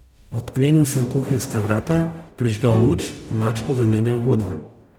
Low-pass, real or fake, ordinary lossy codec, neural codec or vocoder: 19.8 kHz; fake; none; codec, 44.1 kHz, 0.9 kbps, DAC